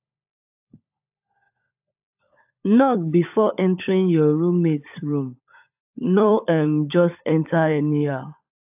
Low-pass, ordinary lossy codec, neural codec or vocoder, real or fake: 3.6 kHz; AAC, 32 kbps; codec, 16 kHz, 16 kbps, FunCodec, trained on LibriTTS, 50 frames a second; fake